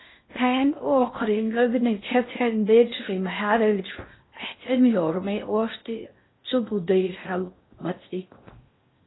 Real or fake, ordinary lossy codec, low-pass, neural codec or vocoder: fake; AAC, 16 kbps; 7.2 kHz; codec, 16 kHz in and 24 kHz out, 0.6 kbps, FocalCodec, streaming, 2048 codes